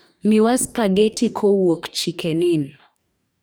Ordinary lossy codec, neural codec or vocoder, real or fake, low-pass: none; codec, 44.1 kHz, 2.6 kbps, DAC; fake; none